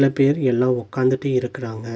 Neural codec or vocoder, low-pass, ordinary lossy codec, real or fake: none; none; none; real